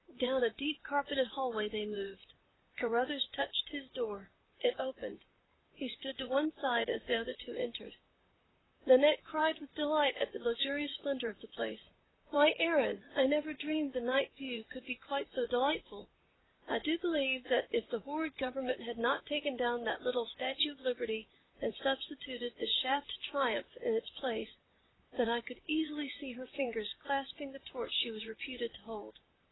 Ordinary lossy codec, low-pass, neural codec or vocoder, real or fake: AAC, 16 kbps; 7.2 kHz; vocoder, 44.1 kHz, 128 mel bands, Pupu-Vocoder; fake